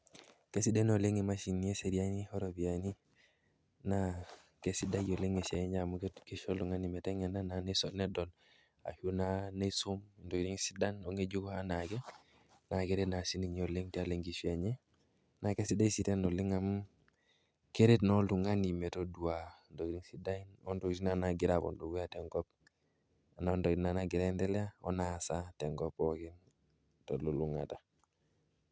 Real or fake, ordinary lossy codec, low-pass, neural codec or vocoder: real; none; none; none